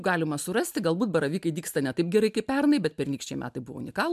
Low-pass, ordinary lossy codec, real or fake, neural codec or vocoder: 14.4 kHz; MP3, 96 kbps; real; none